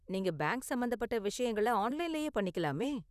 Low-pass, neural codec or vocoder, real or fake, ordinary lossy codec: 14.4 kHz; vocoder, 44.1 kHz, 128 mel bands, Pupu-Vocoder; fake; none